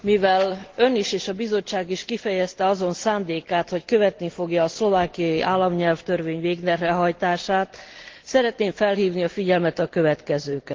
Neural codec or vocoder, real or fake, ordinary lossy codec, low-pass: none; real; Opus, 16 kbps; 7.2 kHz